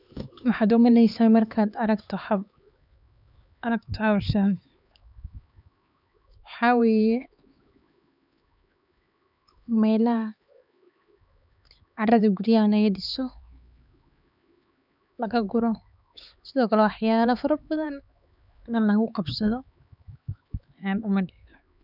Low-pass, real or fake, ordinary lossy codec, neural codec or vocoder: 5.4 kHz; fake; none; codec, 16 kHz, 4 kbps, X-Codec, HuBERT features, trained on LibriSpeech